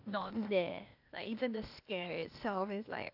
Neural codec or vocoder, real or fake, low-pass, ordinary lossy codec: codec, 16 kHz, 0.8 kbps, ZipCodec; fake; 5.4 kHz; AAC, 48 kbps